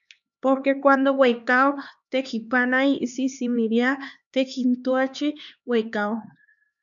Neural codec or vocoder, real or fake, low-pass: codec, 16 kHz, 2 kbps, X-Codec, HuBERT features, trained on LibriSpeech; fake; 7.2 kHz